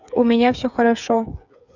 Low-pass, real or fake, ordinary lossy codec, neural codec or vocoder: 7.2 kHz; fake; none; codec, 16 kHz, 8 kbps, FreqCodec, smaller model